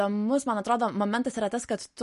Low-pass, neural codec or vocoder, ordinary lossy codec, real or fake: 14.4 kHz; none; MP3, 48 kbps; real